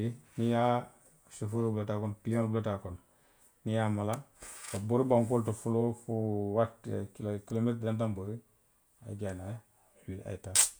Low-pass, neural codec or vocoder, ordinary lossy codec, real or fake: none; vocoder, 48 kHz, 128 mel bands, Vocos; none; fake